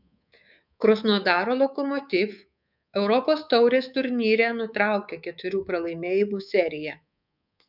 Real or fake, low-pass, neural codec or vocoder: fake; 5.4 kHz; codec, 24 kHz, 3.1 kbps, DualCodec